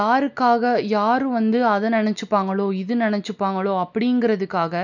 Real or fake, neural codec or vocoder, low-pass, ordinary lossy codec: real; none; 7.2 kHz; none